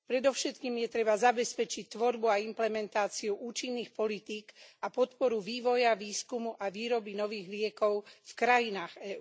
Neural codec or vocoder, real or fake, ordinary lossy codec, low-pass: none; real; none; none